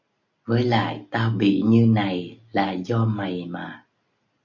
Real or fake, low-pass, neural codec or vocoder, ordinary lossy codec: real; 7.2 kHz; none; MP3, 64 kbps